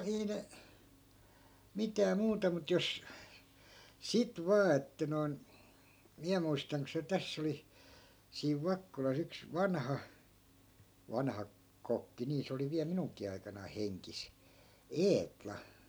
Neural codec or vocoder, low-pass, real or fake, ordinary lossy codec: none; none; real; none